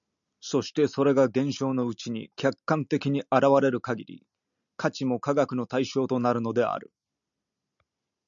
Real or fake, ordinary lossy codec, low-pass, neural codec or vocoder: real; MP3, 96 kbps; 7.2 kHz; none